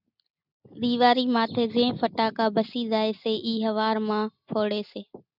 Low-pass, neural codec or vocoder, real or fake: 5.4 kHz; none; real